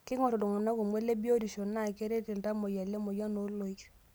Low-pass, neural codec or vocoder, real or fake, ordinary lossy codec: none; none; real; none